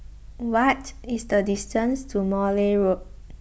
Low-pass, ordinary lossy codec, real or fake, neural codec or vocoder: none; none; real; none